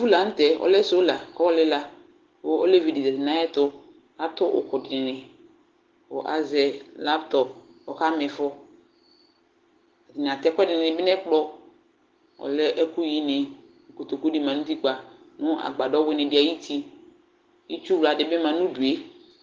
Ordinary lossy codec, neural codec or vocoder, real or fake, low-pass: Opus, 16 kbps; none; real; 7.2 kHz